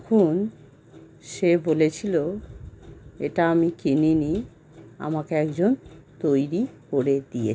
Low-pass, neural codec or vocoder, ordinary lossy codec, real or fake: none; none; none; real